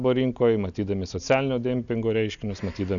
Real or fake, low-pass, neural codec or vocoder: real; 7.2 kHz; none